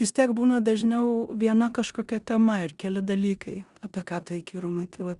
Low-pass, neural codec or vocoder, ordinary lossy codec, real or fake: 10.8 kHz; codec, 16 kHz in and 24 kHz out, 0.9 kbps, LongCat-Audio-Codec, four codebook decoder; Opus, 64 kbps; fake